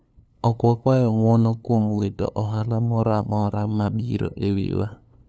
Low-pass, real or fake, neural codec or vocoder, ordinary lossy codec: none; fake; codec, 16 kHz, 2 kbps, FunCodec, trained on LibriTTS, 25 frames a second; none